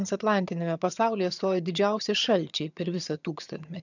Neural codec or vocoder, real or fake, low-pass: vocoder, 22.05 kHz, 80 mel bands, HiFi-GAN; fake; 7.2 kHz